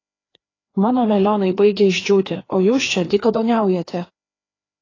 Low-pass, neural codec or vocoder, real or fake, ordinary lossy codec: 7.2 kHz; codec, 16 kHz, 2 kbps, FreqCodec, larger model; fake; AAC, 32 kbps